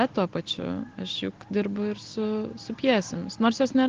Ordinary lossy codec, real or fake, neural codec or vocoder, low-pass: Opus, 16 kbps; real; none; 7.2 kHz